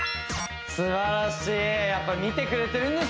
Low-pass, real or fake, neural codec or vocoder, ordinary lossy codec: none; real; none; none